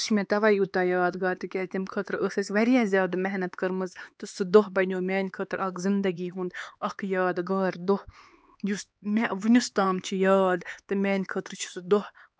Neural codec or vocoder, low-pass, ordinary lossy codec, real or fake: codec, 16 kHz, 4 kbps, X-Codec, HuBERT features, trained on LibriSpeech; none; none; fake